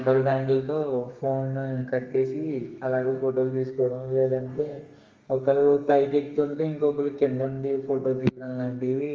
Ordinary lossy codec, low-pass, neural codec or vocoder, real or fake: Opus, 32 kbps; 7.2 kHz; codec, 32 kHz, 1.9 kbps, SNAC; fake